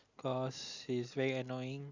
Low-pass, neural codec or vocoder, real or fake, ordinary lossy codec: 7.2 kHz; none; real; Opus, 64 kbps